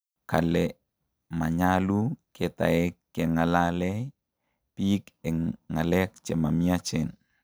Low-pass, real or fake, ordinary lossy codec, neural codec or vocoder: none; real; none; none